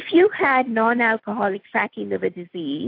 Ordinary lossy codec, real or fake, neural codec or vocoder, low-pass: AAC, 32 kbps; real; none; 5.4 kHz